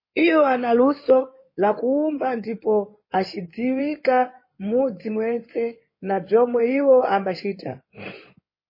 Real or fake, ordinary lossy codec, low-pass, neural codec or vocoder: fake; MP3, 24 kbps; 5.4 kHz; codec, 16 kHz in and 24 kHz out, 2.2 kbps, FireRedTTS-2 codec